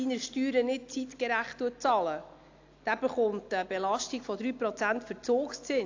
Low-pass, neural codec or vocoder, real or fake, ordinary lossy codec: 7.2 kHz; none; real; AAC, 48 kbps